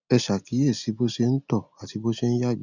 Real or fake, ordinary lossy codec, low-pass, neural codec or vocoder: real; none; 7.2 kHz; none